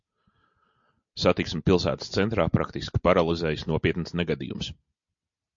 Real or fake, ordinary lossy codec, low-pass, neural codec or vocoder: real; MP3, 48 kbps; 7.2 kHz; none